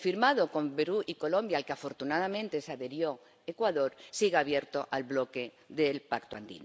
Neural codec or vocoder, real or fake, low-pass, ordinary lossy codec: none; real; none; none